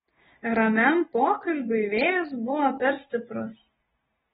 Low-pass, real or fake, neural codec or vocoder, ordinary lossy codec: 10.8 kHz; real; none; AAC, 16 kbps